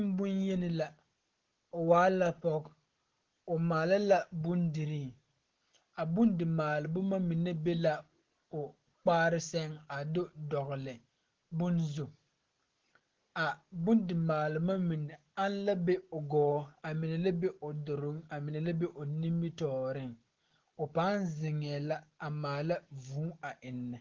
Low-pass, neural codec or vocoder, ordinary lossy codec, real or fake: 7.2 kHz; none; Opus, 16 kbps; real